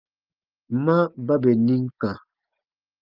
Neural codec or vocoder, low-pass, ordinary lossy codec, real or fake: none; 5.4 kHz; Opus, 24 kbps; real